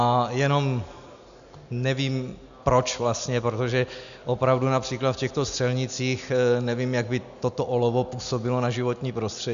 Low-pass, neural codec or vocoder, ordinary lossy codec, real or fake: 7.2 kHz; none; MP3, 96 kbps; real